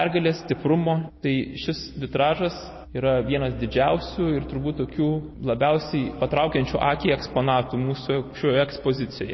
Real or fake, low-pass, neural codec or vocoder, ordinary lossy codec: real; 7.2 kHz; none; MP3, 24 kbps